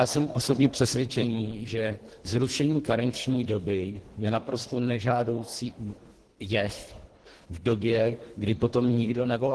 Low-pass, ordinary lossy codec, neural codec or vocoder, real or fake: 10.8 kHz; Opus, 16 kbps; codec, 24 kHz, 1.5 kbps, HILCodec; fake